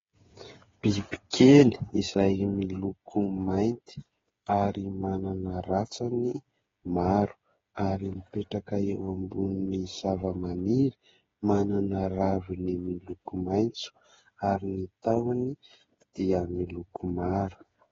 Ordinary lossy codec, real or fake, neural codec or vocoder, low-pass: AAC, 24 kbps; fake; codec, 16 kHz, 8 kbps, FreqCodec, smaller model; 7.2 kHz